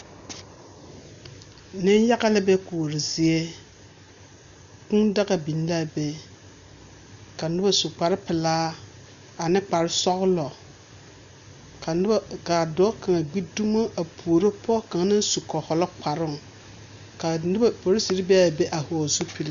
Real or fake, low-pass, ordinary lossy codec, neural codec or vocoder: real; 7.2 kHz; AAC, 96 kbps; none